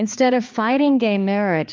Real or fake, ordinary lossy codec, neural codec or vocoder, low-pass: fake; Opus, 32 kbps; codec, 16 kHz, 2 kbps, X-Codec, HuBERT features, trained on balanced general audio; 7.2 kHz